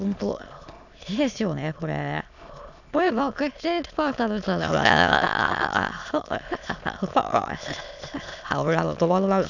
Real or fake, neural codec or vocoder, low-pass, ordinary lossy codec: fake; autoencoder, 22.05 kHz, a latent of 192 numbers a frame, VITS, trained on many speakers; 7.2 kHz; none